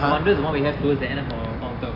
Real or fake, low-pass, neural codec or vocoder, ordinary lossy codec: real; 5.4 kHz; none; AAC, 48 kbps